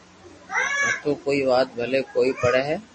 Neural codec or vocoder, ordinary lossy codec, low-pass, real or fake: none; MP3, 32 kbps; 10.8 kHz; real